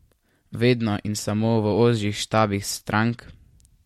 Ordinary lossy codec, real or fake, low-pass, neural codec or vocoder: MP3, 64 kbps; real; 19.8 kHz; none